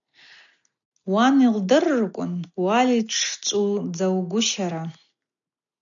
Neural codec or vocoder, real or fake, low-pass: none; real; 7.2 kHz